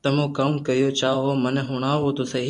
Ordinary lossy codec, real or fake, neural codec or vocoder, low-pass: MP3, 96 kbps; fake; vocoder, 44.1 kHz, 128 mel bands every 512 samples, BigVGAN v2; 10.8 kHz